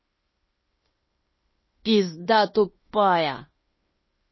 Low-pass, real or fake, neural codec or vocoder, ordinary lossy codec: 7.2 kHz; fake; codec, 16 kHz in and 24 kHz out, 0.9 kbps, LongCat-Audio-Codec, fine tuned four codebook decoder; MP3, 24 kbps